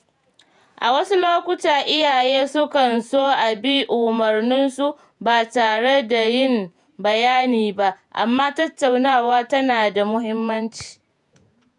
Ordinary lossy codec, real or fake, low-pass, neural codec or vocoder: none; fake; 10.8 kHz; vocoder, 48 kHz, 128 mel bands, Vocos